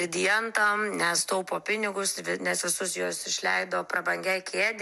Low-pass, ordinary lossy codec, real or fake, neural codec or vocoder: 14.4 kHz; MP3, 96 kbps; real; none